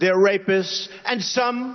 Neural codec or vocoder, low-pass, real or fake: none; 7.2 kHz; real